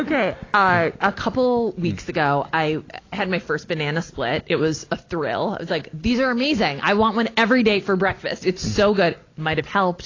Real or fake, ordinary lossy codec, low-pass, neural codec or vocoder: real; AAC, 32 kbps; 7.2 kHz; none